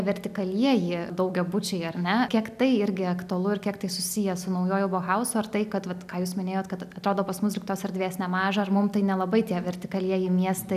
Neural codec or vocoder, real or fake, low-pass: none; real; 14.4 kHz